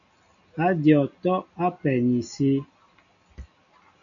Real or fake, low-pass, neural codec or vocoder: real; 7.2 kHz; none